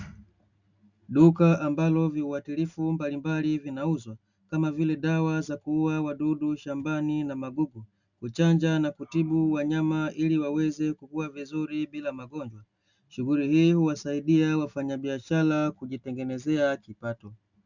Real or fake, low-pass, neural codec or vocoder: real; 7.2 kHz; none